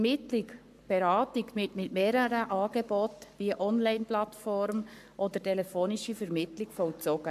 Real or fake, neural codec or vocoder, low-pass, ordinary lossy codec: fake; codec, 44.1 kHz, 7.8 kbps, Pupu-Codec; 14.4 kHz; AAC, 96 kbps